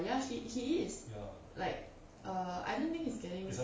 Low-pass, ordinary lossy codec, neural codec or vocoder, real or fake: none; none; none; real